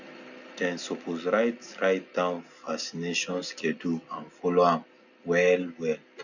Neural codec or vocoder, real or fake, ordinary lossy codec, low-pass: none; real; none; 7.2 kHz